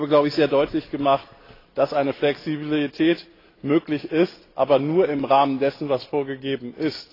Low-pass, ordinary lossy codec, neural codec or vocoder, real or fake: 5.4 kHz; AAC, 24 kbps; none; real